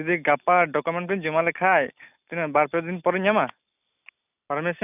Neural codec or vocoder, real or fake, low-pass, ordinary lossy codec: none; real; 3.6 kHz; none